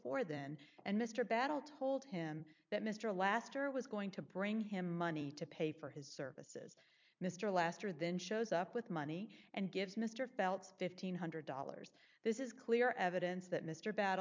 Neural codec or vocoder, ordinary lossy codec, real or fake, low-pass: vocoder, 44.1 kHz, 128 mel bands every 256 samples, BigVGAN v2; MP3, 64 kbps; fake; 7.2 kHz